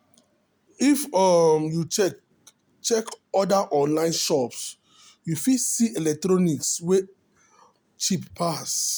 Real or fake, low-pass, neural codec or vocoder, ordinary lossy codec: real; none; none; none